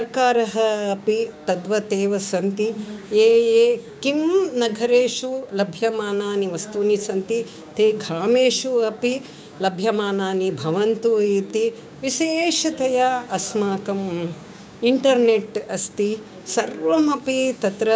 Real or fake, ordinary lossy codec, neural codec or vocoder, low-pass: fake; none; codec, 16 kHz, 6 kbps, DAC; none